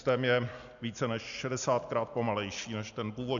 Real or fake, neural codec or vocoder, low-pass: real; none; 7.2 kHz